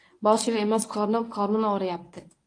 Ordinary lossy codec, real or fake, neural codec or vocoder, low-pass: AAC, 48 kbps; fake; codec, 24 kHz, 0.9 kbps, WavTokenizer, medium speech release version 1; 9.9 kHz